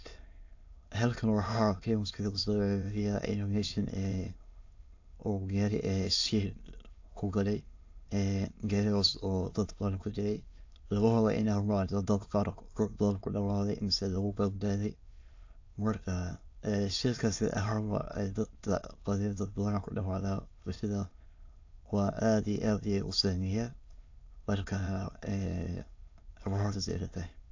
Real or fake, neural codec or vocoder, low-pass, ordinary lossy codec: fake; autoencoder, 22.05 kHz, a latent of 192 numbers a frame, VITS, trained on many speakers; 7.2 kHz; AAC, 48 kbps